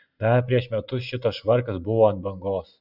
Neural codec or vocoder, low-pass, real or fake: none; 5.4 kHz; real